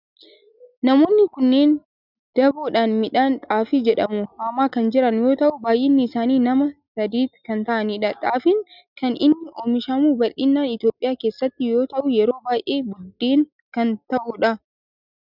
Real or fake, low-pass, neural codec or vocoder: real; 5.4 kHz; none